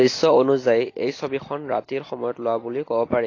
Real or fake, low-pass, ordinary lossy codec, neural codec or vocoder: real; 7.2 kHz; AAC, 32 kbps; none